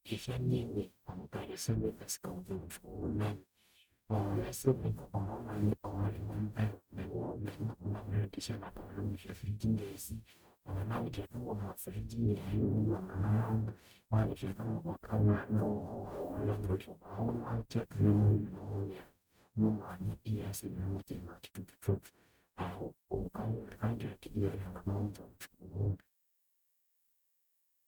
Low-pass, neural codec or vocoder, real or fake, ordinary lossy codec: none; codec, 44.1 kHz, 0.9 kbps, DAC; fake; none